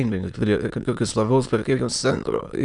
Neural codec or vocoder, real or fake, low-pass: autoencoder, 22.05 kHz, a latent of 192 numbers a frame, VITS, trained on many speakers; fake; 9.9 kHz